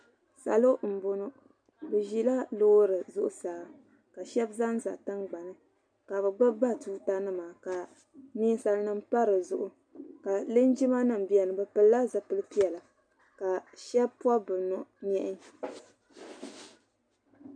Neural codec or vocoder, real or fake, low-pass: none; real; 9.9 kHz